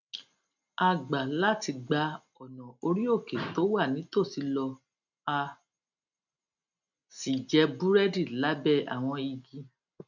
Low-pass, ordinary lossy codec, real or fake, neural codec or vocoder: 7.2 kHz; none; real; none